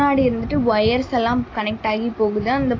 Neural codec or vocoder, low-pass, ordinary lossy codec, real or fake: none; 7.2 kHz; AAC, 32 kbps; real